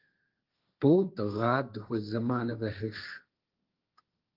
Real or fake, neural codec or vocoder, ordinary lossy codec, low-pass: fake; codec, 16 kHz, 1.1 kbps, Voila-Tokenizer; Opus, 32 kbps; 5.4 kHz